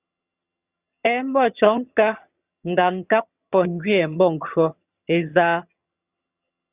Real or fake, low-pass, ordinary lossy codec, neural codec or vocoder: fake; 3.6 kHz; Opus, 64 kbps; vocoder, 22.05 kHz, 80 mel bands, HiFi-GAN